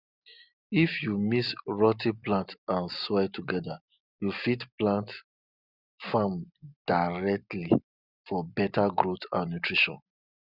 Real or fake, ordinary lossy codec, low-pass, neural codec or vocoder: real; none; 5.4 kHz; none